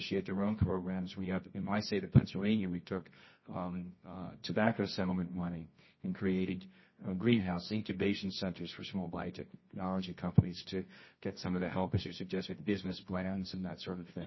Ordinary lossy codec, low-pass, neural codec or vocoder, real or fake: MP3, 24 kbps; 7.2 kHz; codec, 24 kHz, 0.9 kbps, WavTokenizer, medium music audio release; fake